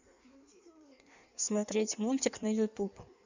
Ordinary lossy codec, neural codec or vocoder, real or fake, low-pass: none; codec, 16 kHz in and 24 kHz out, 1.1 kbps, FireRedTTS-2 codec; fake; 7.2 kHz